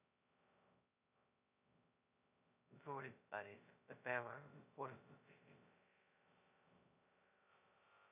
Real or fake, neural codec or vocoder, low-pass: fake; codec, 16 kHz, 0.2 kbps, FocalCodec; 3.6 kHz